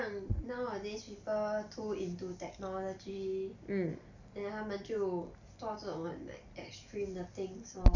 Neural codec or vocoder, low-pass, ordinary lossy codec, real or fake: none; 7.2 kHz; none; real